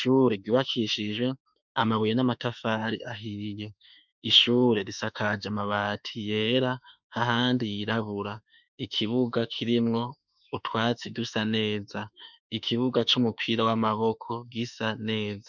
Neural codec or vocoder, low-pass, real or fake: autoencoder, 48 kHz, 32 numbers a frame, DAC-VAE, trained on Japanese speech; 7.2 kHz; fake